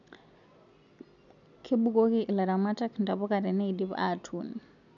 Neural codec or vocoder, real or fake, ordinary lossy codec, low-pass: none; real; none; 7.2 kHz